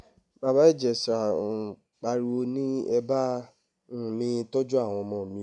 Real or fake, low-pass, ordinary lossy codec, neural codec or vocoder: real; 10.8 kHz; MP3, 96 kbps; none